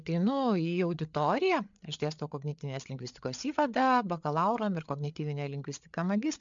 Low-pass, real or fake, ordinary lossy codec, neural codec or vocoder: 7.2 kHz; fake; MP3, 64 kbps; codec, 16 kHz, 8 kbps, FreqCodec, larger model